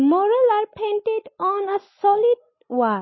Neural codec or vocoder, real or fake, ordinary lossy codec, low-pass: none; real; MP3, 24 kbps; 7.2 kHz